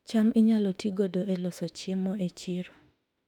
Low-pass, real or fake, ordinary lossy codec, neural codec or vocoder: 19.8 kHz; fake; none; autoencoder, 48 kHz, 32 numbers a frame, DAC-VAE, trained on Japanese speech